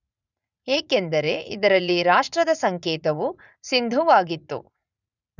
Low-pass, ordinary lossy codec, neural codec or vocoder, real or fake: 7.2 kHz; none; vocoder, 44.1 kHz, 80 mel bands, Vocos; fake